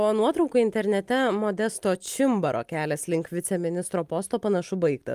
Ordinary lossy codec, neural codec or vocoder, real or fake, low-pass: Opus, 32 kbps; none; real; 14.4 kHz